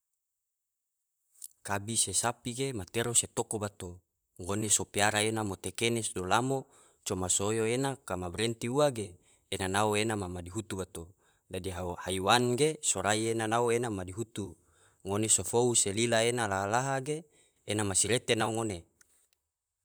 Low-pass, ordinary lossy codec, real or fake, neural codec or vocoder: none; none; fake; vocoder, 44.1 kHz, 128 mel bands, Pupu-Vocoder